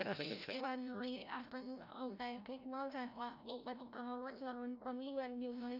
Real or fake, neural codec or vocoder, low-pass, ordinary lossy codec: fake; codec, 16 kHz, 0.5 kbps, FreqCodec, larger model; 5.4 kHz; none